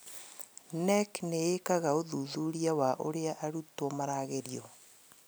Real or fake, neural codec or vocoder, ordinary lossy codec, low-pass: real; none; none; none